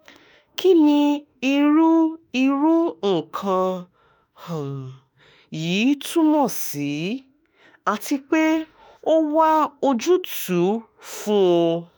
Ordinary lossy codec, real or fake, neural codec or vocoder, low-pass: none; fake; autoencoder, 48 kHz, 32 numbers a frame, DAC-VAE, trained on Japanese speech; none